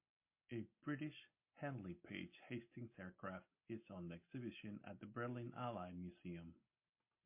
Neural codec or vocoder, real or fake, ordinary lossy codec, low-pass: none; real; MP3, 24 kbps; 3.6 kHz